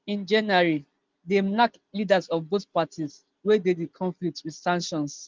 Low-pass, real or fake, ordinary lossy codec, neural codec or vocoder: 7.2 kHz; real; Opus, 32 kbps; none